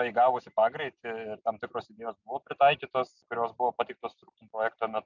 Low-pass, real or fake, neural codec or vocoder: 7.2 kHz; real; none